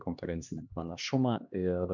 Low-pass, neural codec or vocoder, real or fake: 7.2 kHz; codec, 16 kHz, 2 kbps, X-Codec, HuBERT features, trained on LibriSpeech; fake